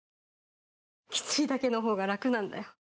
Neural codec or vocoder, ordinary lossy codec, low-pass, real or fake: none; none; none; real